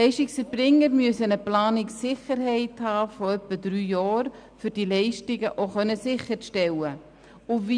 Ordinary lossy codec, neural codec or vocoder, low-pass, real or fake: none; none; 9.9 kHz; real